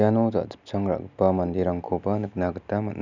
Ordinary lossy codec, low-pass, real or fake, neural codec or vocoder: none; 7.2 kHz; real; none